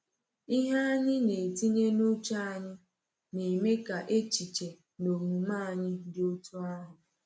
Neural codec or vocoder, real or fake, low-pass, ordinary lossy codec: none; real; none; none